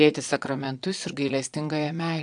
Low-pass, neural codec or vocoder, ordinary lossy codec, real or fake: 9.9 kHz; vocoder, 22.05 kHz, 80 mel bands, Vocos; AAC, 64 kbps; fake